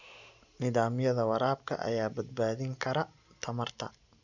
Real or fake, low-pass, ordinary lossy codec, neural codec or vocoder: real; 7.2 kHz; none; none